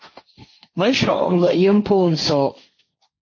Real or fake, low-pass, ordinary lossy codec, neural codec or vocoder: fake; 7.2 kHz; MP3, 32 kbps; codec, 16 kHz, 1.1 kbps, Voila-Tokenizer